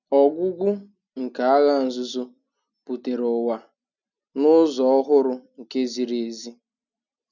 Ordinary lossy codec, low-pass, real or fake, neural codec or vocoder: none; 7.2 kHz; real; none